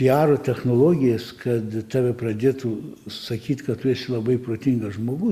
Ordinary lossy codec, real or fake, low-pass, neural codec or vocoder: Opus, 64 kbps; real; 14.4 kHz; none